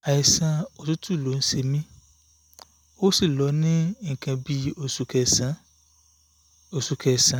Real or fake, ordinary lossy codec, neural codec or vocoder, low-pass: real; none; none; none